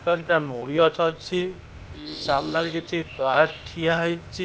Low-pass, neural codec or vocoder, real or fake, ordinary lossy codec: none; codec, 16 kHz, 0.8 kbps, ZipCodec; fake; none